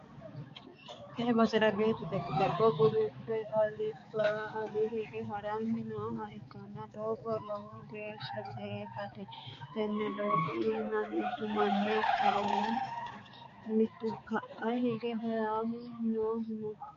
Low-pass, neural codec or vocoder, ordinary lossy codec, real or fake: 7.2 kHz; codec, 16 kHz, 4 kbps, X-Codec, HuBERT features, trained on balanced general audio; MP3, 48 kbps; fake